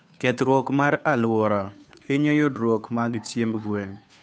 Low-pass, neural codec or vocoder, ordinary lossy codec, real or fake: none; codec, 16 kHz, 2 kbps, FunCodec, trained on Chinese and English, 25 frames a second; none; fake